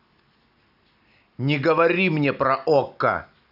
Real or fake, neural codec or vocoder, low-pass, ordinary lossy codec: real; none; 5.4 kHz; none